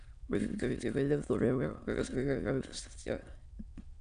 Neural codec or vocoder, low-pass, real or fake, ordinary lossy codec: autoencoder, 22.05 kHz, a latent of 192 numbers a frame, VITS, trained on many speakers; 9.9 kHz; fake; MP3, 96 kbps